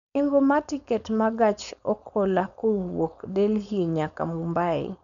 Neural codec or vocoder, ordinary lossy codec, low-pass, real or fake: codec, 16 kHz, 4.8 kbps, FACodec; none; 7.2 kHz; fake